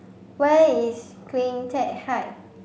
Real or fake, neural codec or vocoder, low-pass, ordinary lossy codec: real; none; none; none